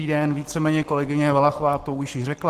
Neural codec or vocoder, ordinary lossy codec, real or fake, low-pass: codec, 44.1 kHz, 7.8 kbps, Pupu-Codec; Opus, 16 kbps; fake; 14.4 kHz